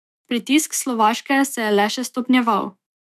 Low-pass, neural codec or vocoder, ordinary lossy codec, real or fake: 14.4 kHz; autoencoder, 48 kHz, 128 numbers a frame, DAC-VAE, trained on Japanese speech; none; fake